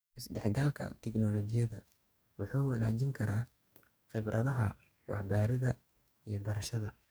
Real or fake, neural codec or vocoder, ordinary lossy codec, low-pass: fake; codec, 44.1 kHz, 2.6 kbps, DAC; none; none